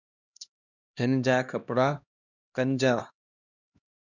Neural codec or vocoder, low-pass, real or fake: codec, 16 kHz, 1 kbps, X-Codec, HuBERT features, trained on LibriSpeech; 7.2 kHz; fake